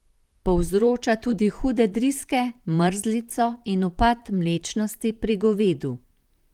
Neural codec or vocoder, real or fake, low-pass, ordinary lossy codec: vocoder, 44.1 kHz, 128 mel bands, Pupu-Vocoder; fake; 19.8 kHz; Opus, 24 kbps